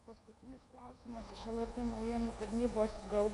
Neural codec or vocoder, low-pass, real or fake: codec, 24 kHz, 1.2 kbps, DualCodec; 10.8 kHz; fake